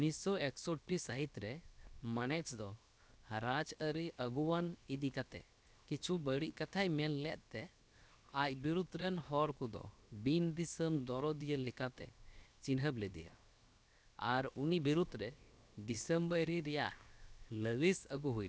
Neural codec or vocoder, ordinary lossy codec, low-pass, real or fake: codec, 16 kHz, 0.7 kbps, FocalCodec; none; none; fake